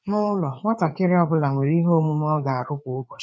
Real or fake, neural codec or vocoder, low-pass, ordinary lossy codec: fake; codec, 16 kHz, 4 kbps, FreqCodec, larger model; none; none